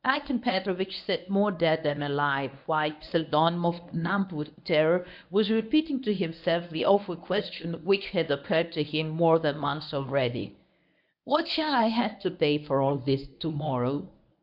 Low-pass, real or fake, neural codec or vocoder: 5.4 kHz; fake; codec, 24 kHz, 0.9 kbps, WavTokenizer, medium speech release version 1